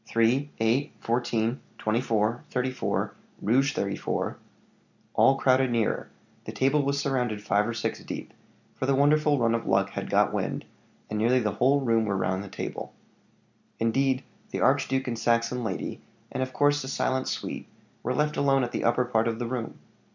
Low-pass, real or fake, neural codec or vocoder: 7.2 kHz; real; none